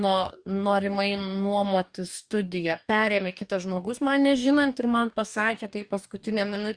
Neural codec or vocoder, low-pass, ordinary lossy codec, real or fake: codec, 44.1 kHz, 2.6 kbps, DAC; 9.9 kHz; Opus, 64 kbps; fake